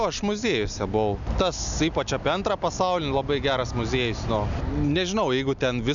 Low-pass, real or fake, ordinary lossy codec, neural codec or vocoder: 7.2 kHz; real; MP3, 96 kbps; none